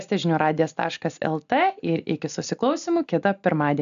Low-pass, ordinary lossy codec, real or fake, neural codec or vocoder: 7.2 kHz; AAC, 96 kbps; real; none